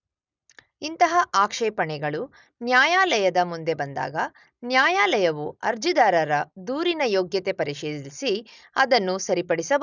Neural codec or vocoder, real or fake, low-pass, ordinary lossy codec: none; real; 7.2 kHz; none